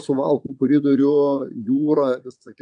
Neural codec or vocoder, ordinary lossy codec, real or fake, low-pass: vocoder, 22.05 kHz, 80 mel bands, Vocos; AAC, 64 kbps; fake; 9.9 kHz